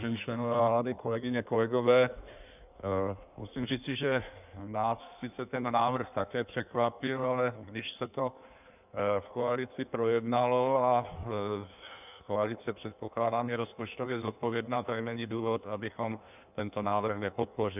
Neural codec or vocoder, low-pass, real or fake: codec, 16 kHz in and 24 kHz out, 1.1 kbps, FireRedTTS-2 codec; 3.6 kHz; fake